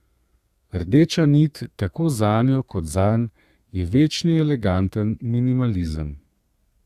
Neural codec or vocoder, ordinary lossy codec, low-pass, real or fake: codec, 32 kHz, 1.9 kbps, SNAC; Opus, 64 kbps; 14.4 kHz; fake